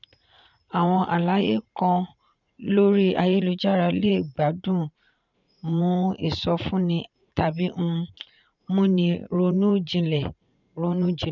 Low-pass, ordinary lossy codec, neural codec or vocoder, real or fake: 7.2 kHz; none; vocoder, 44.1 kHz, 80 mel bands, Vocos; fake